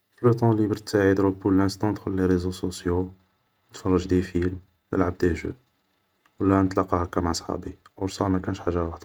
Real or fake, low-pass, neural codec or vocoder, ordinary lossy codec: fake; 19.8 kHz; vocoder, 48 kHz, 128 mel bands, Vocos; none